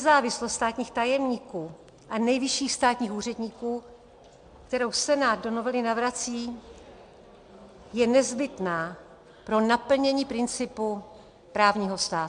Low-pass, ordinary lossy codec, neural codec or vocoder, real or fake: 9.9 kHz; MP3, 64 kbps; none; real